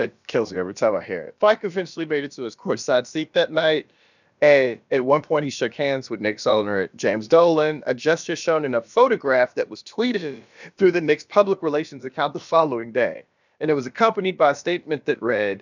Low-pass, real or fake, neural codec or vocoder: 7.2 kHz; fake; codec, 16 kHz, about 1 kbps, DyCAST, with the encoder's durations